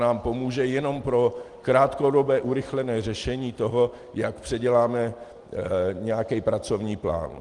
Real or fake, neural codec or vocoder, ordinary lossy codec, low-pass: real; none; Opus, 24 kbps; 10.8 kHz